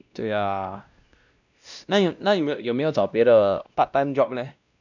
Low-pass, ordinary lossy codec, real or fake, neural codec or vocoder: 7.2 kHz; none; fake; codec, 16 kHz, 1 kbps, X-Codec, WavLM features, trained on Multilingual LibriSpeech